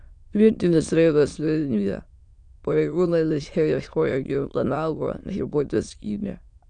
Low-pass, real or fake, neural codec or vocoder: 9.9 kHz; fake; autoencoder, 22.05 kHz, a latent of 192 numbers a frame, VITS, trained on many speakers